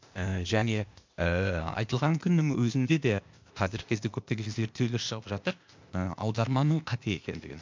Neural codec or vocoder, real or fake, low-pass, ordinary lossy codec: codec, 16 kHz, 0.8 kbps, ZipCodec; fake; 7.2 kHz; MP3, 64 kbps